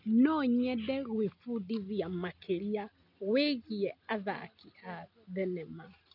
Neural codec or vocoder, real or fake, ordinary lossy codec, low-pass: none; real; none; 5.4 kHz